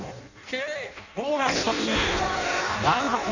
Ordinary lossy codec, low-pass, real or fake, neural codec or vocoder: none; 7.2 kHz; fake; codec, 16 kHz in and 24 kHz out, 0.6 kbps, FireRedTTS-2 codec